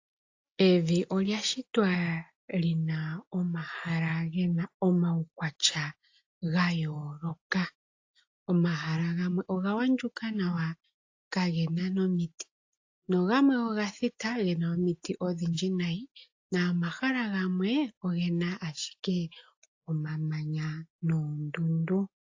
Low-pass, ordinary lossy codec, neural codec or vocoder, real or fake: 7.2 kHz; AAC, 48 kbps; none; real